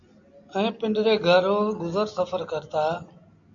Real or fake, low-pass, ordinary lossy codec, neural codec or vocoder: real; 7.2 kHz; AAC, 48 kbps; none